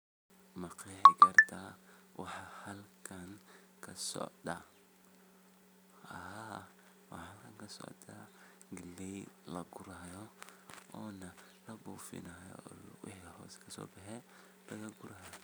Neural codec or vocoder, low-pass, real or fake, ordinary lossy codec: none; none; real; none